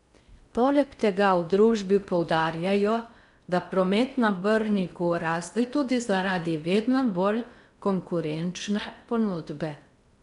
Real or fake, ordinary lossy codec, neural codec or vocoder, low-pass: fake; none; codec, 16 kHz in and 24 kHz out, 0.6 kbps, FocalCodec, streaming, 4096 codes; 10.8 kHz